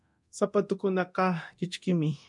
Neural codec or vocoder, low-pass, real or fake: codec, 24 kHz, 0.9 kbps, DualCodec; 10.8 kHz; fake